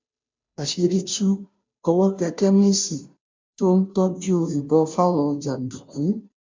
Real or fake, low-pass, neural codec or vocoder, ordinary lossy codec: fake; 7.2 kHz; codec, 16 kHz, 0.5 kbps, FunCodec, trained on Chinese and English, 25 frames a second; MP3, 64 kbps